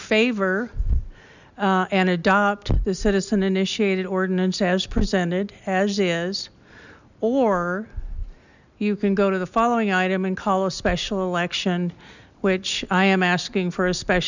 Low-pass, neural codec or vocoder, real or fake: 7.2 kHz; none; real